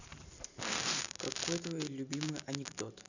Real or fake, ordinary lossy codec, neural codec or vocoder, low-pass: fake; none; vocoder, 44.1 kHz, 128 mel bands every 512 samples, BigVGAN v2; 7.2 kHz